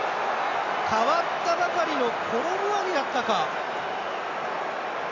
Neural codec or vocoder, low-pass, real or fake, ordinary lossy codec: none; 7.2 kHz; real; AAC, 48 kbps